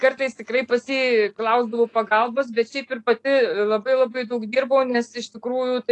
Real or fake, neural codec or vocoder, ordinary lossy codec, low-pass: real; none; AAC, 48 kbps; 10.8 kHz